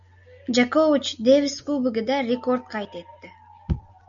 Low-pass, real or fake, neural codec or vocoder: 7.2 kHz; real; none